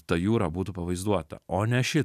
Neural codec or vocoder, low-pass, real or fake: none; 14.4 kHz; real